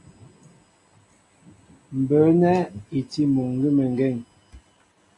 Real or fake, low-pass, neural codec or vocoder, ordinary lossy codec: real; 10.8 kHz; none; AAC, 48 kbps